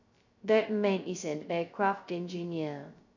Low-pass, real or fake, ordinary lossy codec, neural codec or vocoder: 7.2 kHz; fake; none; codec, 16 kHz, 0.2 kbps, FocalCodec